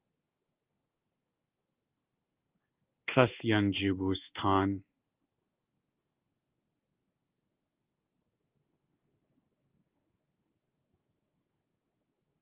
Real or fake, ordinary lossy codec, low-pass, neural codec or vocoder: fake; Opus, 24 kbps; 3.6 kHz; codec, 16 kHz in and 24 kHz out, 1 kbps, XY-Tokenizer